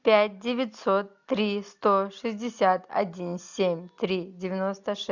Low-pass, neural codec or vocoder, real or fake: 7.2 kHz; none; real